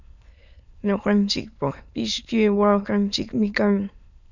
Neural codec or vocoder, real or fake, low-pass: autoencoder, 22.05 kHz, a latent of 192 numbers a frame, VITS, trained on many speakers; fake; 7.2 kHz